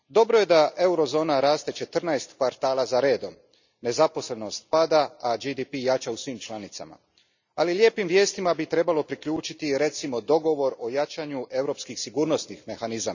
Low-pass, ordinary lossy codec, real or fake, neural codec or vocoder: 7.2 kHz; none; real; none